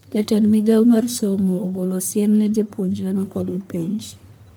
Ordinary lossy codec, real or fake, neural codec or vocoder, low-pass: none; fake; codec, 44.1 kHz, 1.7 kbps, Pupu-Codec; none